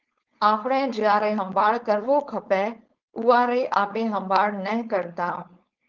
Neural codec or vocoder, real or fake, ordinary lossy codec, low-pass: codec, 16 kHz, 4.8 kbps, FACodec; fake; Opus, 32 kbps; 7.2 kHz